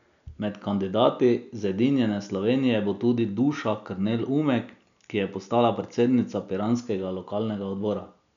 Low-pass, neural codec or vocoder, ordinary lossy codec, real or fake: 7.2 kHz; none; none; real